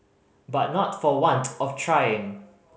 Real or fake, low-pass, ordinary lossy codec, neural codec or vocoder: real; none; none; none